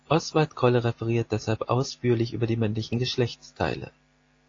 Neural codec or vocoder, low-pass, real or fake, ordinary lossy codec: none; 7.2 kHz; real; AAC, 32 kbps